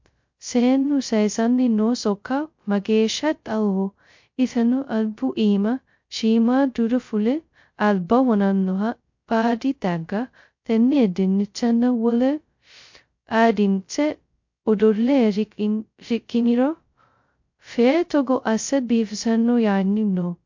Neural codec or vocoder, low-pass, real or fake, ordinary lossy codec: codec, 16 kHz, 0.2 kbps, FocalCodec; 7.2 kHz; fake; MP3, 48 kbps